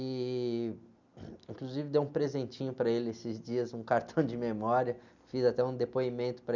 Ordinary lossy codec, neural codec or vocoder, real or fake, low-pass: none; none; real; 7.2 kHz